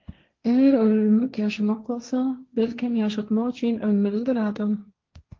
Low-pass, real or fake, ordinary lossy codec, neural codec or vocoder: 7.2 kHz; fake; Opus, 24 kbps; codec, 16 kHz, 1.1 kbps, Voila-Tokenizer